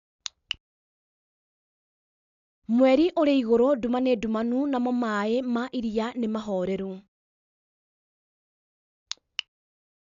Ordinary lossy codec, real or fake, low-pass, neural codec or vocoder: none; real; 7.2 kHz; none